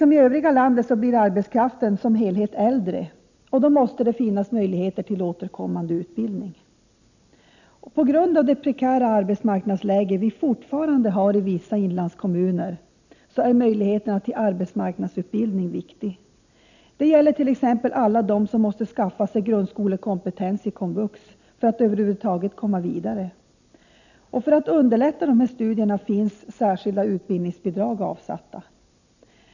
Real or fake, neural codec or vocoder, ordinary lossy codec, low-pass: real; none; none; 7.2 kHz